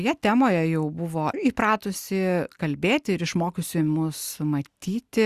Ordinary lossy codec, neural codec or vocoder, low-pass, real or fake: Opus, 64 kbps; none; 14.4 kHz; real